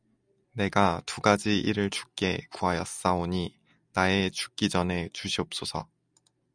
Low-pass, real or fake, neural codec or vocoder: 9.9 kHz; real; none